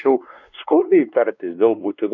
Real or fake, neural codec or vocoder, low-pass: fake; codec, 16 kHz, 2 kbps, X-Codec, WavLM features, trained on Multilingual LibriSpeech; 7.2 kHz